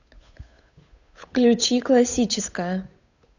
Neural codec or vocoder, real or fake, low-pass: codec, 16 kHz, 8 kbps, FunCodec, trained on Chinese and English, 25 frames a second; fake; 7.2 kHz